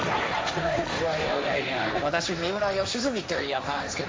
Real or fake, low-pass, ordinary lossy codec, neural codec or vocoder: fake; none; none; codec, 16 kHz, 1.1 kbps, Voila-Tokenizer